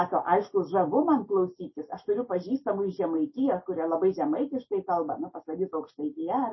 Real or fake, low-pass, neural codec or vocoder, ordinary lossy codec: real; 7.2 kHz; none; MP3, 24 kbps